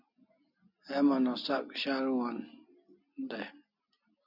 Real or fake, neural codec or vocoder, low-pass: real; none; 5.4 kHz